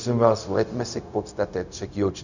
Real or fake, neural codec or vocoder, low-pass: fake; codec, 16 kHz, 0.4 kbps, LongCat-Audio-Codec; 7.2 kHz